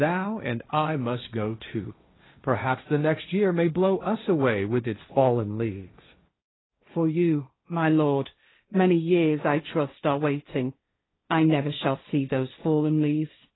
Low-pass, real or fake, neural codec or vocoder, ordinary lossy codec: 7.2 kHz; fake; codec, 16 kHz, 1.1 kbps, Voila-Tokenizer; AAC, 16 kbps